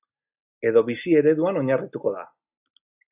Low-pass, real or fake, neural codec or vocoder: 3.6 kHz; real; none